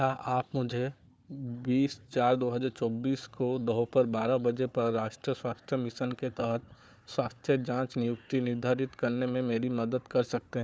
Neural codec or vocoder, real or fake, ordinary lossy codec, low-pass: codec, 16 kHz, 4 kbps, FunCodec, trained on Chinese and English, 50 frames a second; fake; none; none